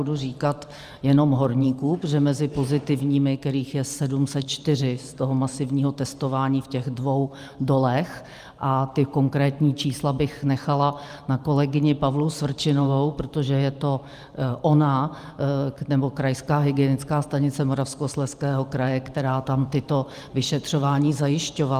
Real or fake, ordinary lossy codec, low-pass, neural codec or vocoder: fake; Opus, 32 kbps; 14.4 kHz; vocoder, 44.1 kHz, 128 mel bands every 512 samples, BigVGAN v2